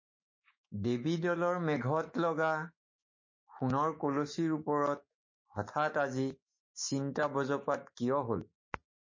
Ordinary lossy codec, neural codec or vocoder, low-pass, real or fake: MP3, 32 kbps; autoencoder, 48 kHz, 128 numbers a frame, DAC-VAE, trained on Japanese speech; 7.2 kHz; fake